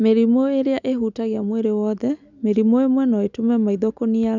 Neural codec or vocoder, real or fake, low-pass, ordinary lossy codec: none; real; 7.2 kHz; none